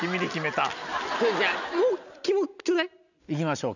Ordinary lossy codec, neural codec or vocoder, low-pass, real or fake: none; none; 7.2 kHz; real